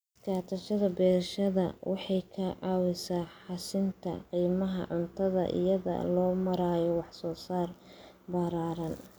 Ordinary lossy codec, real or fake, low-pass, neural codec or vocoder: none; real; none; none